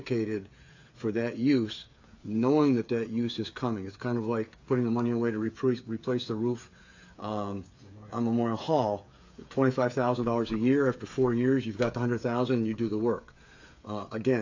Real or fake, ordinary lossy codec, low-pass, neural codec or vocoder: fake; AAC, 48 kbps; 7.2 kHz; codec, 16 kHz, 8 kbps, FreqCodec, smaller model